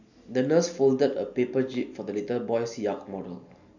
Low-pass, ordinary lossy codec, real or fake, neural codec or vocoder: 7.2 kHz; none; real; none